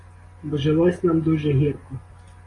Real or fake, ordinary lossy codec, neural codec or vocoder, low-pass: real; AAC, 32 kbps; none; 10.8 kHz